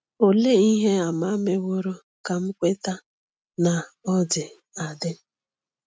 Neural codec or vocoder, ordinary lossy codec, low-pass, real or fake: none; none; none; real